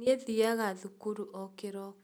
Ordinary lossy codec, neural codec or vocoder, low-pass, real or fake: none; none; none; real